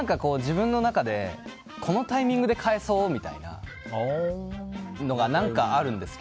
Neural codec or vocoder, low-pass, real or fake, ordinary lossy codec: none; none; real; none